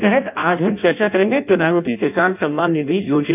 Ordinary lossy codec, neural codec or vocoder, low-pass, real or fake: none; codec, 16 kHz in and 24 kHz out, 0.6 kbps, FireRedTTS-2 codec; 3.6 kHz; fake